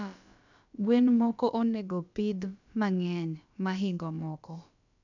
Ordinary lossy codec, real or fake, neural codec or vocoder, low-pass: none; fake; codec, 16 kHz, about 1 kbps, DyCAST, with the encoder's durations; 7.2 kHz